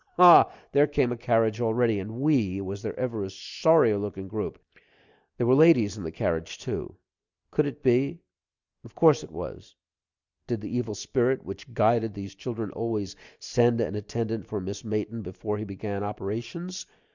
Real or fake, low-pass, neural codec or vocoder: real; 7.2 kHz; none